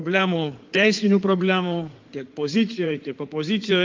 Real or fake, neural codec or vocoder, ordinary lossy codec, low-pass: fake; codec, 16 kHz in and 24 kHz out, 2.2 kbps, FireRedTTS-2 codec; Opus, 32 kbps; 7.2 kHz